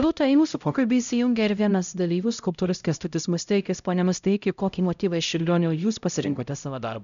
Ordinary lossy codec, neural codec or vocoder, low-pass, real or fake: MP3, 96 kbps; codec, 16 kHz, 0.5 kbps, X-Codec, HuBERT features, trained on LibriSpeech; 7.2 kHz; fake